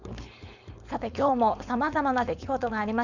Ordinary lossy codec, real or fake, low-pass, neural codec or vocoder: none; fake; 7.2 kHz; codec, 16 kHz, 4.8 kbps, FACodec